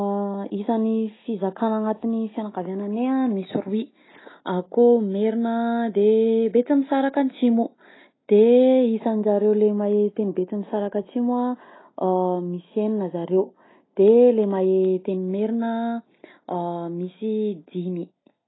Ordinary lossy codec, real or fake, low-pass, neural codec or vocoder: AAC, 16 kbps; real; 7.2 kHz; none